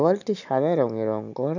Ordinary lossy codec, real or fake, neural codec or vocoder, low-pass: none; real; none; 7.2 kHz